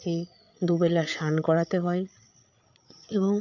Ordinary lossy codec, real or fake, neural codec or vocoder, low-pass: none; fake; codec, 16 kHz, 8 kbps, FreqCodec, larger model; 7.2 kHz